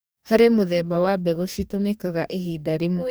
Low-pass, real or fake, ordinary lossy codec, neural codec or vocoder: none; fake; none; codec, 44.1 kHz, 2.6 kbps, DAC